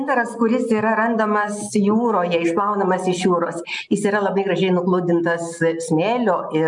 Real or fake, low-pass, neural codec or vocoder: real; 10.8 kHz; none